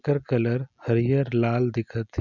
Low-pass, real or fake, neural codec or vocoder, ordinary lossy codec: 7.2 kHz; real; none; none